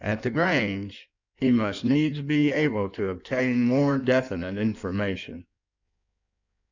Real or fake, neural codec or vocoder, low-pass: fake; codec, 16 kHz in and 24 kHz out, 1.1 kbps, FireRedTTS-2 codec; 7.2 kHz